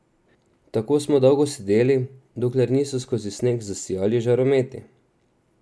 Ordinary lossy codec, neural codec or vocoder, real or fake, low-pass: none; none; real; none